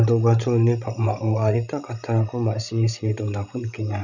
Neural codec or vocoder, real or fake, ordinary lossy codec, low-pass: codec, 16 kHz, 16 kbps, FreqCodec, larger model; fake; none; 7.2 kHz